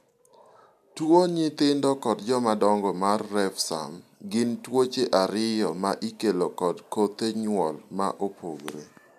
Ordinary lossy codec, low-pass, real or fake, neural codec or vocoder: none; 14.4 kHz; real; none